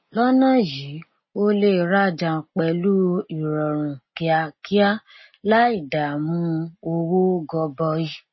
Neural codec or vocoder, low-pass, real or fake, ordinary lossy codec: none; 7.2 kHz; real; MP3, 24 kbps